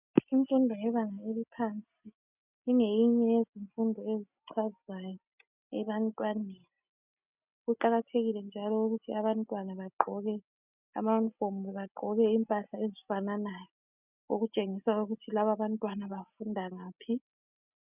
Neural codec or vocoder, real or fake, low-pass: none; real; 3.6 kHz